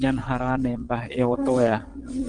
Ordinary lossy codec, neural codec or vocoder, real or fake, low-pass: Opus, 24 kbps; vocoder, 22.05 kHz, 80 mel bands, Vocos; fake; 9.9 kHz